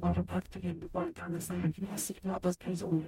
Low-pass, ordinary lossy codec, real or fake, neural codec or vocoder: 19.8 kHz; MP3, 64 kbps; fake; codec, 44.1 kHz, 0.9 kbps, DAC